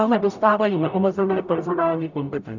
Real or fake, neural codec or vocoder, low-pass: fake; codec, 44.1 kHz, 0.9 kbps, DAC; 7.2 kHz